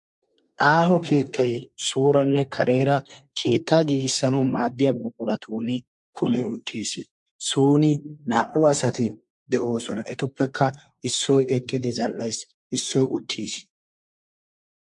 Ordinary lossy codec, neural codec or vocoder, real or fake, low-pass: MP3, 64 kbps; codec, 24 kHz, 1 kbps, SNAC; fake; 10.8 kHz